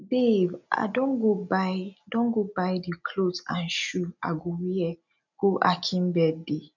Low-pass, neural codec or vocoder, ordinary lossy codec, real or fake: 7.2 kHz; none; none; real